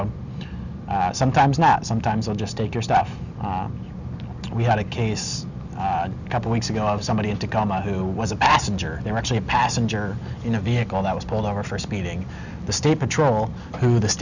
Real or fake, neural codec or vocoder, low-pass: real; none; 7.2 kHz